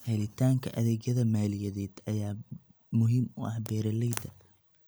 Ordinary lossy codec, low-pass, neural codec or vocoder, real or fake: none; none; none; real